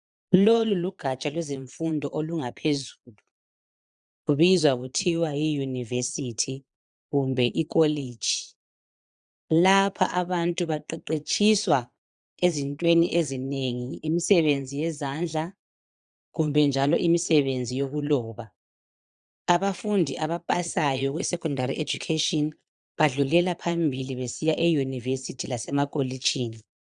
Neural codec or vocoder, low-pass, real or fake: vocoder, 22.05 kHz, 80 mel bands, Vocos; 9.9 kHz; fake